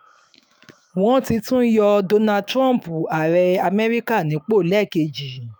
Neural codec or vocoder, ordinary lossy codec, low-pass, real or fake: autoencoder, 48 kHz, 128 numbers a frame, DAC-VAE, trained on Japanese speech; none; none; fake